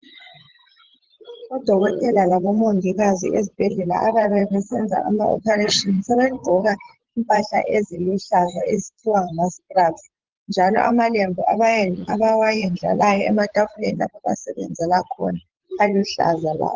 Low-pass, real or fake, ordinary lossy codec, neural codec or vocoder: 7.2 kHz; fake; Opus, 16 kbps; vocoder, 44.1 kHz, 80 mel bands, Vocos